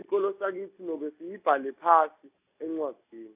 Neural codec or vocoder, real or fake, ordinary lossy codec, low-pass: none; real; AAC, 32 kbps; 3.6 kHz